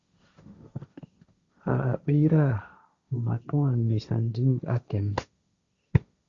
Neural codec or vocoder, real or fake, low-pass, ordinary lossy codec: codec, 16 kHz, 1.1 kbps, Voila-Tokenizer; fake; 7.2 kHz; Opus, 64 kbps